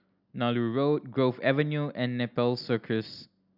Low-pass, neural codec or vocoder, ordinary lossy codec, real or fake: 5.4 kHz; none; none; real